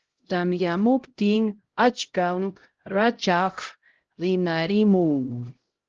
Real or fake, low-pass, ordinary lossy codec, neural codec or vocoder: fake; 7.2 kHz; Opus, 16 kbps; codec, 16 kHz, 0.5 kbps, X-Codec, HuBERT features, trained on LibriSpeech